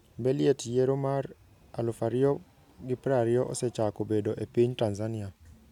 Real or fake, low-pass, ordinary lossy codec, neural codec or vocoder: real; 19.8 kHz; none; none